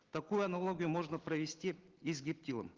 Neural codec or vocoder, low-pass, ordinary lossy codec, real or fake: none; 7.2 kHz; Opus, 16 kbps; real